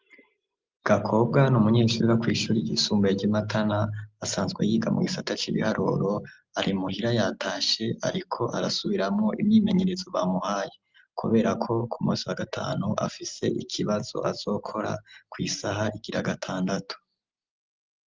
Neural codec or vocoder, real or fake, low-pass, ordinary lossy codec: none; real; 7.2 kHz; Opus, 32 kbps